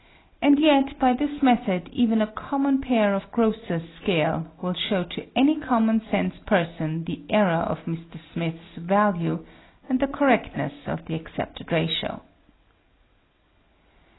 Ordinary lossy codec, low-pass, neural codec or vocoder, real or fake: AAC, 16 kbps; 7.2 kHz; none; real